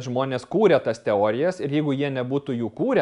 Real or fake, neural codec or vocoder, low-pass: real; none; 10.8 kHz